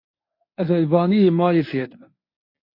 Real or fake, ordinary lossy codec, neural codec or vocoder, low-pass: fake; MP3, 32 kbps; codec, 24 kHz, 0.9 kbps, WavTokenizer, medium speech release version 1; 5.4 kHz